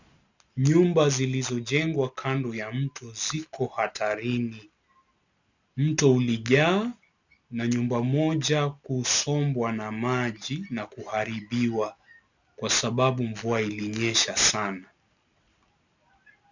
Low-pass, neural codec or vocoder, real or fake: 7.2 kHz; none; real